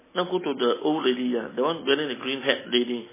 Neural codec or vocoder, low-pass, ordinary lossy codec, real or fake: none; 3.6 kHz; MP3, 16 kbps; real